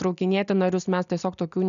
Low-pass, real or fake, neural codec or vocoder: 7.2 kHz; real; none